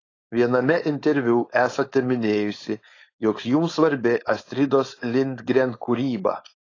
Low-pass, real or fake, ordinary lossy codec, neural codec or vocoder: 7.2 kHz; fake; AAC, 32 kbps; codec, 16 kHz, 4.8 kbps, FACodec